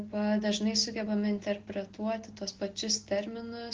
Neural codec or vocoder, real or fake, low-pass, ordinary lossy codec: none; real; 7.2 kHz; Opus, 16 kbps